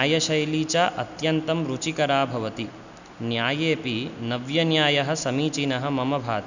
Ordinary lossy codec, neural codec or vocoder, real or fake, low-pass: none; none; real; 7.2 kHz